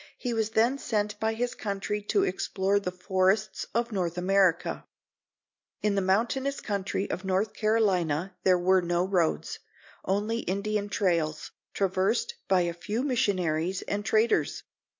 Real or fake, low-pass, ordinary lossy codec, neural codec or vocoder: real; 7.2 kHz; MP3, 48 kbps; none